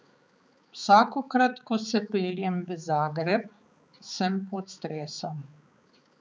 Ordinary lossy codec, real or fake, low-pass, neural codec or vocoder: none; fake; none; codec, 16 kHz, 4 kbps, X-Codec, HuBERT features, trained on balanced general audio